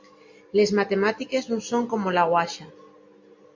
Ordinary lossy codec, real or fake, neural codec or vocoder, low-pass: MP3, 48 kbps; real; none; 7.2 kHz